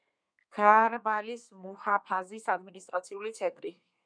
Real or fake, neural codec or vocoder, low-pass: fake; codec, 32 kHz, 1.9 kbps, SNAC; 9.9 kHz